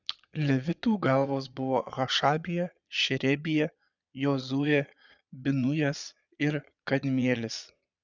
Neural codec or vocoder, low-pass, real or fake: vocoder, 22.05 kHz, 80 mel bands, Vocos; 7.2 kHz; fake